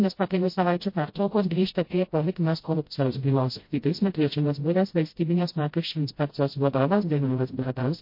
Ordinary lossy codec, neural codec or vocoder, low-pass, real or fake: MP3, 32 kbps; codec, 16 kHz, 0.5 kbps, FreqCodec, smaller model; 5.4 kHz; fake